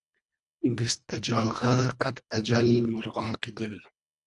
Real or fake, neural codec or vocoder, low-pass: fake; codec, 24 kHz, 1.5 kbps, HILCodec; 10.8 kHz